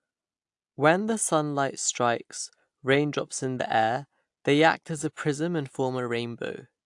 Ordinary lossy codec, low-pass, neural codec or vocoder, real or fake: AAC, 64 kbps; 10.8 kHz; none; real